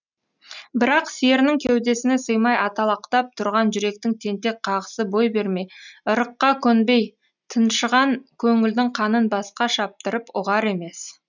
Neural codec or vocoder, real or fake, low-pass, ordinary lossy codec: none; real; 7.2 kHz; none